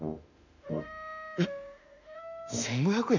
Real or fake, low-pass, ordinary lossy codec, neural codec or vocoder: fake; 7.2 kHz; none; autoencoder, 48 kHz, 32 numbers a frame, DAC-VAE, trained on Japanese speech